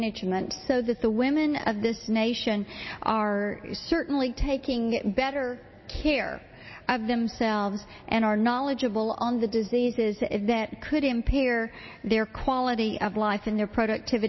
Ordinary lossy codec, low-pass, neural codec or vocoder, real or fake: MP3, 24 kbps; 7.2 kHz; none; real